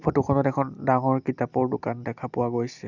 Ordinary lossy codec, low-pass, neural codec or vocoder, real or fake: none; 7.2 kHz; none; real